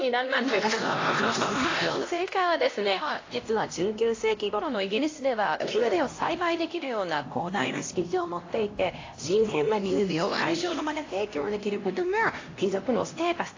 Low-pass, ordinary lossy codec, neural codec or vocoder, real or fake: 7.2 kHz; AAC, 32 kbps; codec, 16 kHz, 1 kbps, X-Codec, HuBERT features, trained on LibriSpeech; fake